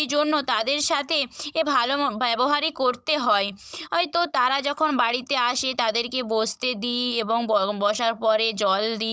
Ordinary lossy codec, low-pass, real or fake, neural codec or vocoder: none; none; fake; codec, 16 kHz, 16 kbps, FunCodec, trained on Chinese and English, 50 frames a second